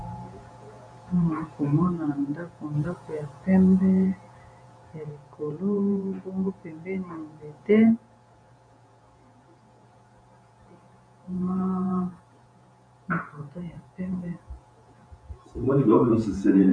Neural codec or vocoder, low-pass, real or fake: vocoder, 48 kHz, 128 mel bands, Vocos; 9.9 kHz; fake